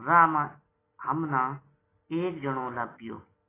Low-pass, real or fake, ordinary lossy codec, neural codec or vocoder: 3.6 kHz; real; AAC, 16 kbps; none